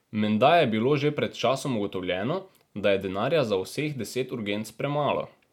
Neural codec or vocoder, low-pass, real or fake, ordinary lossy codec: none; 19.8 kHz; real; MP3, 96 kbps